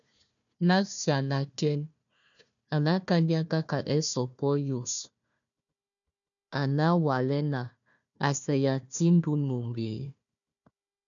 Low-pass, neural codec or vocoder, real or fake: 7.2 kHz; codec, 16 kHz, 1 kbps, FunCodec, trained on Chinese and English, 50 frames a second; fake